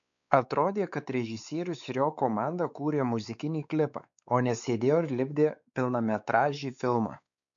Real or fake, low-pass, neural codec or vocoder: fake; 7.2 kHz; codec, 16 kHz, 4 kbps, X-Codec, WavLM features, trained on Multilingual LibriSpeech